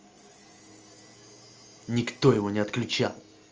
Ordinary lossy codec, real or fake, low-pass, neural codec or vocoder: Opus, 24 kbps; real; 7.2 kHz; none